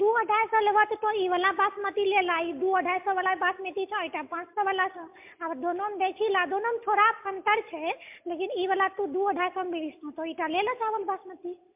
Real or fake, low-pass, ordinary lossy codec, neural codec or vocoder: real; 3.6 kHz; MP3, 32 kbps; none